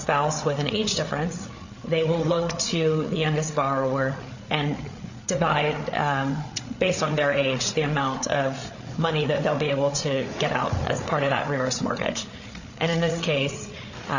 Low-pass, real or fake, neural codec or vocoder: 7.2 kHz; fake; codec, 16 kHz, 8 kbps, FreqCodec, larger model